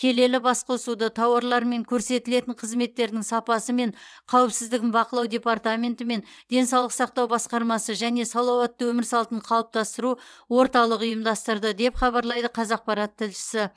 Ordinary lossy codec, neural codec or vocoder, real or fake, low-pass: none; vocoder, 22.05 kHz, 80 mel bands, WaveNeXt; fake; none